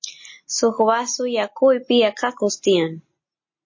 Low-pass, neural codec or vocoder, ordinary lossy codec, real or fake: 7.2 kHz; none; MP3, 32 kbps; real